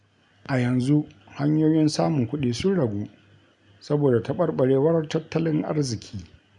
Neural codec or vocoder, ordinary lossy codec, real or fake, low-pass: none; none; real; 10.8 kHz